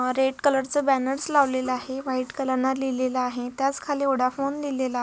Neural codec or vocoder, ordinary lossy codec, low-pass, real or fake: none; none; none; real